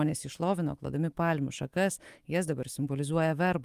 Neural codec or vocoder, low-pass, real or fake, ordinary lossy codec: autoencoder, 48 kHz, 128 numbers a frame, DAC-VAE, trained on Japanese speech; 14.4 kHz; fake; Opus, 24 kbps